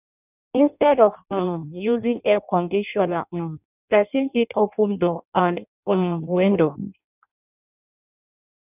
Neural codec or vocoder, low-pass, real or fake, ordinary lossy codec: codec, 16 kHz in and 24 kHz out, 0.6 kbps, FireRedTTS-2 codec; 3.6 kHz; fake; none